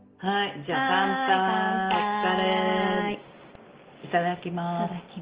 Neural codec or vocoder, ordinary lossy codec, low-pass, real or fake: none; Opus, 16 kbps; 3.6 kHz; real